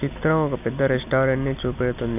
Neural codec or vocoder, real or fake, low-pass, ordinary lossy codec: none; real; 3.6 kHz; none